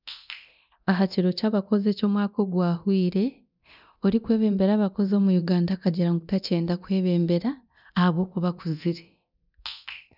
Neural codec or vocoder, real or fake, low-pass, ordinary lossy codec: codec, 24 kHz, 0.9 kbps, DualCodec; fake; 5.4 kHz; none